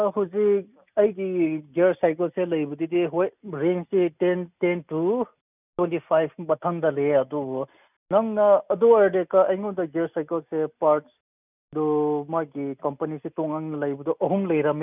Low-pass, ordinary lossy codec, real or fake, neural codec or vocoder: 3.6 kHz; none; real; none